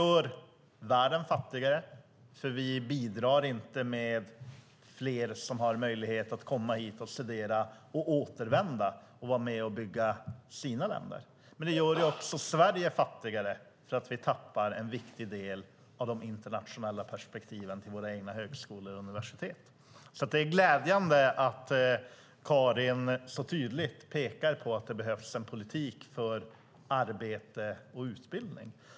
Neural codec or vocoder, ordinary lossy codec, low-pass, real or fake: none; none; none; real